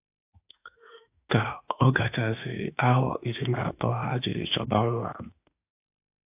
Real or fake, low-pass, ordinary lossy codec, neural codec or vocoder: fake; 3.6 kHz; AAC, 32 kbps; autoencoder, 48 kHz, 32 numbers a frame, DAC-VAE, trained on Japanese speech